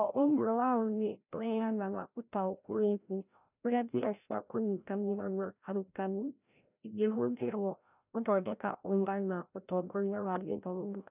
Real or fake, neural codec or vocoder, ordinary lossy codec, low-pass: fake; codec, 16 kHz, 0.5 kbps, FreqCodec, larger model; none; 3.6 kHz